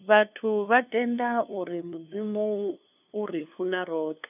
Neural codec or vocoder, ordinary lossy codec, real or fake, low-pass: codec, 16 kHz, 2 kbps, FunCodec, trained on LibriTTS, 25 frames a second; none; fake; 3.6 kHz